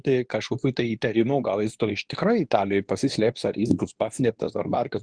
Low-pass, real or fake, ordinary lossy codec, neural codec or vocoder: 9.9 kHz; fake; Opus, 24 kbps; codec, 24 kHz, 0.9 kbps, WavTokenizer, medium speech release version 2